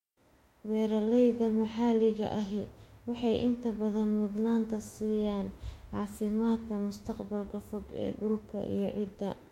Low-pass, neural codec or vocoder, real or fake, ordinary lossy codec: 19.8 kHz; autoencoder, 48 kHz, 32 numbers a frame, DAC-VAE, trained on Japanese speech; fake; MP3, 64 kbps